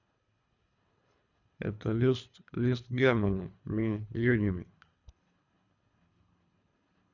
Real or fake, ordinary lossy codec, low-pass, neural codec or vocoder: fake; none; 7.2 kHz; codec, 24 kHz, 3 kbps, HILCodec